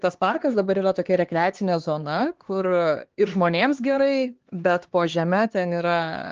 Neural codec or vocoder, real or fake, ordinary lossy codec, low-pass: codec, 16 kHz, 2 kbps, X-Codec, HuBERT features, trained on LibriSpeech; fake; Opus, 16 kbps; 7.2 kHz